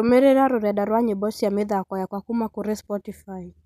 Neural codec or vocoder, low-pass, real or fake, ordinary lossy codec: none; 14.4 kHz; real; none